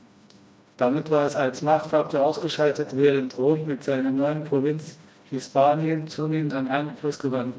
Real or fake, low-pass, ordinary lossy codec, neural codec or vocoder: fake; none; none; codec, 16 kHz, 1 kbps, FreqCodec, smaller model